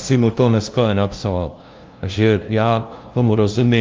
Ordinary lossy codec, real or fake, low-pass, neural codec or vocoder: Opus, 24 kbps; fake; 7.2 kHz; codec, 16 kHz, 0.5 kbps, FunCodec, trained on LibriTTS, 25 frames a second